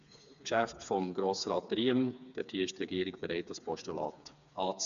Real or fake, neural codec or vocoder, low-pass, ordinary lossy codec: fake; codec, 16 kHz, 4 kbps, FreqCodec, smaller model; 7.2 kHz; none